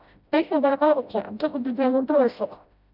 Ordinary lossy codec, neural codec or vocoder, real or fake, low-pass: none; codec, 16 kHz, 0.5 kbps, FreqCodec, smaller model; fake; 5.4 kHz